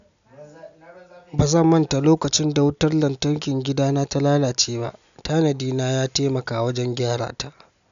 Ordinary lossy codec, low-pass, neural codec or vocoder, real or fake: none; 7.2 kHz; none; real